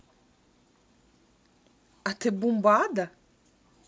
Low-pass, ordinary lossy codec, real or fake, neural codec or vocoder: none; none; real; none